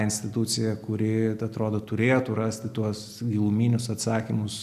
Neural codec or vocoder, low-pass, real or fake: none; 14.4 kHz; real